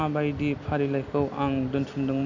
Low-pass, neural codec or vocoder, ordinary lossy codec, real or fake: 7.2 kHz; none; AAC, 48 kbps; real